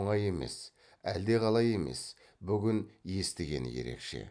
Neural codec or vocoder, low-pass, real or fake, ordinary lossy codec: none; 9.9 kHz; real; none